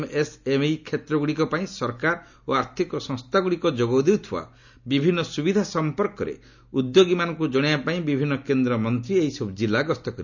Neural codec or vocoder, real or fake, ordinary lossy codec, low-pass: none; real; none; 7.2 kHz